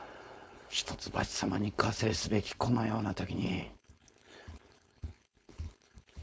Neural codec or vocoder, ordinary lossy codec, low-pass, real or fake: codec, 16 kHz, 4.8 kbps, FACodec; none; none; fake